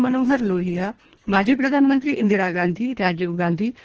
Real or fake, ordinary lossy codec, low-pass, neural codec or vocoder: fake; Opus, 16 kbps; 7.2 kHz; codec, 24 kHz, 1.5 kbps, HILCodec